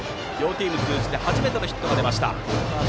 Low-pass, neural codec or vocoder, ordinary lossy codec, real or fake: none; none; none; real